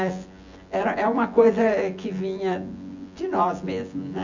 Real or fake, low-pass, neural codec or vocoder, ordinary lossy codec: fake; 7.2 kHz; vocoder, 24 kHz, 100 mel bands, Vocos; none